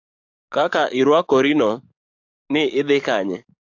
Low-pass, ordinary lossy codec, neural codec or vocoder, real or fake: 7.2 kHz; AAC, 48 kbps; codec, 44.1 kHz, 7.8 kbps, DAC; fake